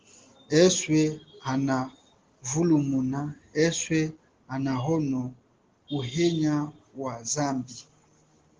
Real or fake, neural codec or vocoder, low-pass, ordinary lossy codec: real; none; 7.2 kHz; Opus, 16 kbps